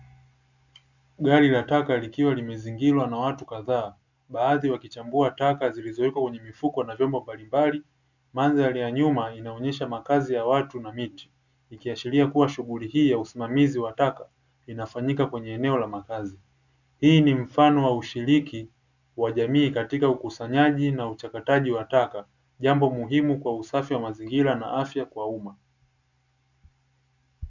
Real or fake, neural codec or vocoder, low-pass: real; none; 7.2 kHz